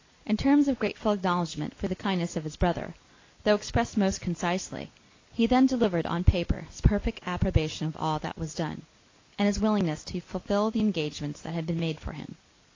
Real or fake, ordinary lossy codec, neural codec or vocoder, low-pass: real; AAC, 32 kbps; none; 7.2 kHz